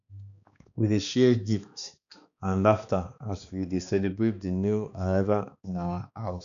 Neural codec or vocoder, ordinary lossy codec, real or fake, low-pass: codec, 16 kHz, 2 kbps, X-Codec, HuBERT features, trained on balanced general audio; none; fake; 7.2 kHz